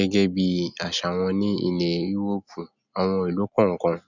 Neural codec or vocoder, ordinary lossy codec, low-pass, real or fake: none; none; 7.2 kHz; real